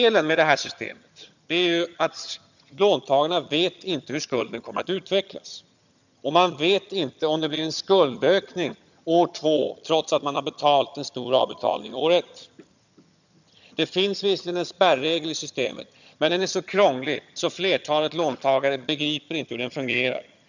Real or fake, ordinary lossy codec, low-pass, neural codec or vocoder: fake; none; 7.2 kHz; vocoder, 22.05 kHz, 80 mel bands, HiFi-GAN